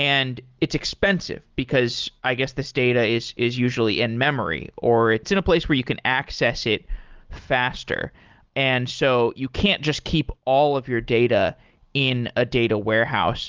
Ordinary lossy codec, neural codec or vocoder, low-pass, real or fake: Opus, 32 kbps; none; 7.2 kHz; real